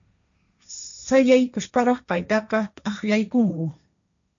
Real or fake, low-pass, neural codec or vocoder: fake; 7.2 kHz; codec, 16 kHz, 1.1 kbps, Voila-Tokenizer